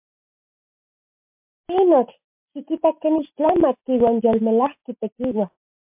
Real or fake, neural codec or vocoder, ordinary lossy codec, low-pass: real; none; MP3, 32 kbps; 3.6 kHz